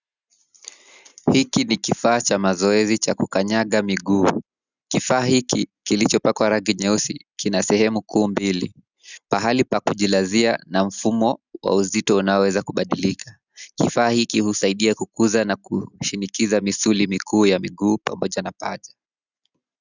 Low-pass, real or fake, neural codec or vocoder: 7.2 kHz; real; none